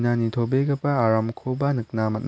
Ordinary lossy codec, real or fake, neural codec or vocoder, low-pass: none; real; none; none